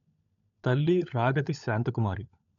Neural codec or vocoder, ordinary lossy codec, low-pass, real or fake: codec, 16 kHz, 16 kbps, FunCodec, trained on LibriTTS, 50 frames a second; none; 7.2 kHz; fake